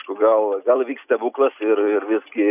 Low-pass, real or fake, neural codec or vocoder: 3.6 kHz; real; none